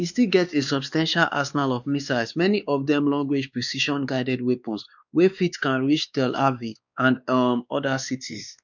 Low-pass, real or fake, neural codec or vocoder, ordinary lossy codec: 7.2 kHz; fake; codec, 16 kHz, 2 kbps, X-Codec, WavLM features, trained on Multilingual LibriSpeech; none